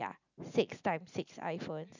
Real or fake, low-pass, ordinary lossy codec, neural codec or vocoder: real; 7.2 kHz; none; none